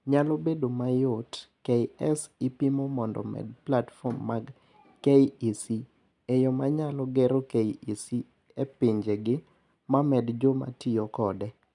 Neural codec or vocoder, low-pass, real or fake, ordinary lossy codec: none; 10.8 kHz; real; none